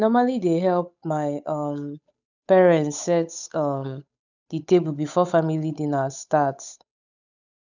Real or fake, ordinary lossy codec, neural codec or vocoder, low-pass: fake; none; codec, 16 kHz, 8 kbps, FunCodec, trained on Chinese and English, 25 frames a second; 7.2 kHz